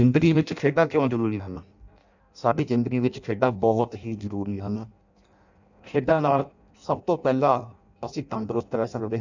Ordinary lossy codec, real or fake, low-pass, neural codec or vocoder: none; fake; 7.2 kHz; codec, 16 kHz in and 24 kHz out, 0.6 kbps, FireRedTTS-2 codec